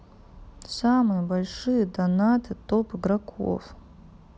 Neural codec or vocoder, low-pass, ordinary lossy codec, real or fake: none; none; none; real